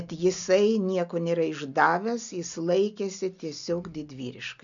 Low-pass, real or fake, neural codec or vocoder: 7.2 kHz; real; none